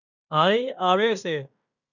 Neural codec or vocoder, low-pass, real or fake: codec, 16 kHz in and 24 kHz out, 0.9 kbps, LongCat-Audio-Codec, fine tuned four codebook decoder; 7.2 kHz; fake